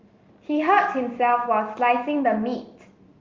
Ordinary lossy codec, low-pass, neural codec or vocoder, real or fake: Opus, 32 kbps; 7.2 kHz; none; real